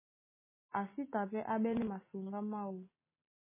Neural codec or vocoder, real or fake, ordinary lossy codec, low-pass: none; real; MP3, 16 kbps; 3.6 kHz